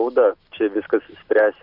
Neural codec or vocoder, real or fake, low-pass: none; real; 5.4 kHz